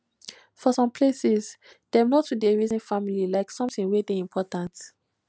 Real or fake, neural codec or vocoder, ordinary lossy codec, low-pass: real; none; none; none